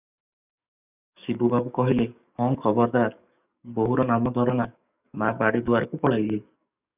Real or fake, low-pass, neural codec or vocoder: fake; 3.6 kHz; codec, 44.1 kHz, 7.8 kbps, DAC